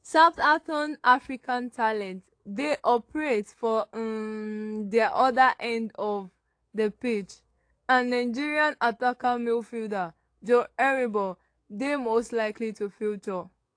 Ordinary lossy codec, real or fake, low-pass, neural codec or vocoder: AAC, 48 kbps; fake; 9.9 kHz; codec, 44.1 kHz, 7.8 kbps, DAC